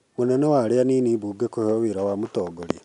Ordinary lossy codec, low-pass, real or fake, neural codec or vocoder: none; 10.8 kHz; real; none